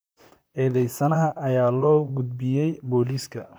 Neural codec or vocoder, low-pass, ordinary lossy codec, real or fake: vocoder, 44.1 kHz, 128 mel bands, Pupu-Vocoder; none; none; fake